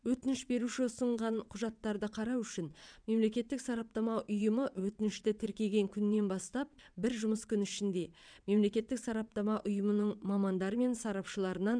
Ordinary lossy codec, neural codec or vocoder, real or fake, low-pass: none; vocoder, 22.05 kHz, 80 mel bands, WaveNeXt; fake; 9.9 kHz